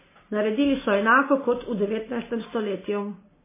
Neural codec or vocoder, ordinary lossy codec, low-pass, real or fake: none; MP3, 16 kbps; 3.6 kHz; real